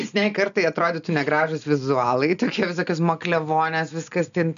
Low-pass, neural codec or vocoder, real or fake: 7.2 kHz; none; real